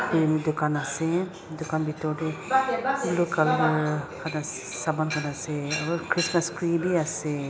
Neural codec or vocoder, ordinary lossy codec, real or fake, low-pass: none; none; real; none